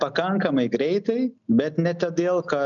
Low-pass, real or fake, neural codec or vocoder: 7.2 kHz; real; none